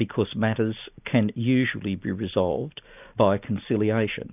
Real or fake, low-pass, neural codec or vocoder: real; 3.6 kHz; none